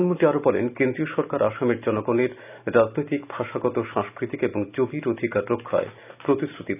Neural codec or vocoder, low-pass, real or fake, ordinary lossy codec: none; 3.6 kHz; real; none